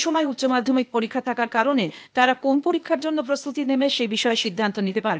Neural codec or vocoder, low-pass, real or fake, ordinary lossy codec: codec, 16 kHz, 0.8 kbps, ZipCodec; none; fake; none